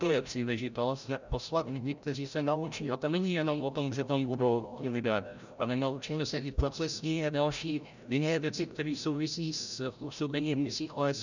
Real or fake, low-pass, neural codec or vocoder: fake; 7.2 kHz; codec, 16 kHz, 0.5 kbps, FreqCodec, larger model